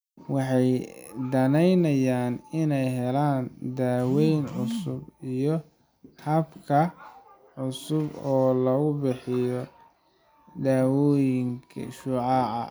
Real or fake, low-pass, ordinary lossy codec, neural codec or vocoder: real; none; none; none